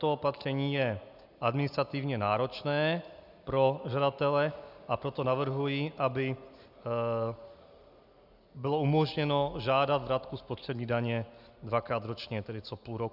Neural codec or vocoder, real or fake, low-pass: none; real; 5.4 kHz